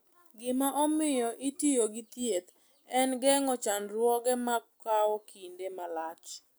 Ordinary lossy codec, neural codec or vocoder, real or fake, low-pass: none; none; real; none